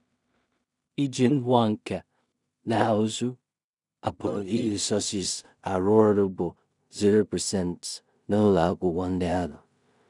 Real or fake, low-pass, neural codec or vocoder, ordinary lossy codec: fake; 10.8 kHz; codec, 16 kHz in and 24 kHz out, 0.4 kbps, LongCat-Audio-Codec, two codebook decoder; none